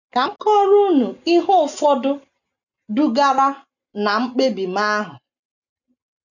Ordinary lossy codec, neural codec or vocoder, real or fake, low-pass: none; none; real; 7.2 kHz